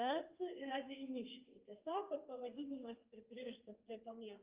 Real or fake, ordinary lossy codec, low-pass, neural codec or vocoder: fake; Opus, 24 kbps; 3.6 kHz; codec, 32 kHz, 1.9 kbps, SNAC